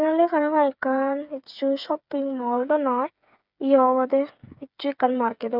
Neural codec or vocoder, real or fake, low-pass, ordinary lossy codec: codec, 16 kHz, 8 kbps, FreqCodec, smaller model; fake; 5.4 kHz; none